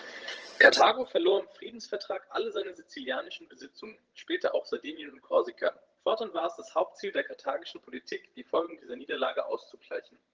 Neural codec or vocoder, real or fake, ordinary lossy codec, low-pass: vocoder, 22.05 kHz, 80 mel bands, HiFi-GAN; fake; Opus, 16 kbps; 7.2 kHz